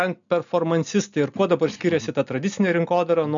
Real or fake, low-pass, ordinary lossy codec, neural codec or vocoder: real; 7.2 kHz; MP3, 96 kbps; none